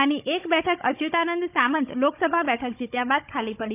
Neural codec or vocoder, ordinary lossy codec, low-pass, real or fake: codec, 16 kHz, 16 kbps, FunCodec, trained on Chinese and English, 50 frames a second; none; 3.6 kHz; fake